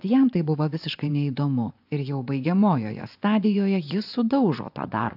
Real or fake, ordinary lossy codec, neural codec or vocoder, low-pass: fake; AAC, 48 kbps; vocoder, 24 kHz, 100 mel bands, Vocos; 5.4 kHz